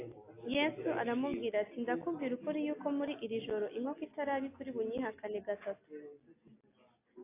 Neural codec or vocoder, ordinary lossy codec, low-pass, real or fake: none; AAC, 24 kbps; 3.6 kHz; real